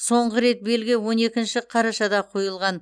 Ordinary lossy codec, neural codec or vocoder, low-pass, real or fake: none; none; 9.9 kHz; real